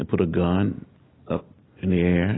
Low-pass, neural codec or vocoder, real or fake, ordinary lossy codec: 7.2 kHz; none; real; AAC, 16 kbps